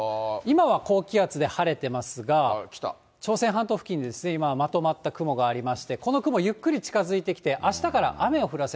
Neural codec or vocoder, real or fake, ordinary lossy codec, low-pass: none; real; none; none